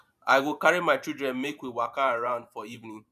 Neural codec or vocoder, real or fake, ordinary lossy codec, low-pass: vocoder, 44.1 kHz, 128 mel bands every 512 samples, BigVGAN v2; fake; none; 14.4 kHz